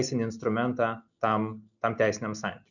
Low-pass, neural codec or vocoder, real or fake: 7.2 kHz; none; real